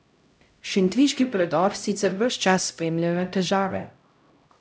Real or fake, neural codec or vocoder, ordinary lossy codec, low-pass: fake; codec, 16 kHz, 0.5 kbps, X-Codec, HuBERT features, trained on LibriSpeech; none; none